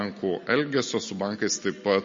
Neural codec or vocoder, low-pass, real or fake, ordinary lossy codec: none; 7.2 kHz; real; MP3, 32 kbps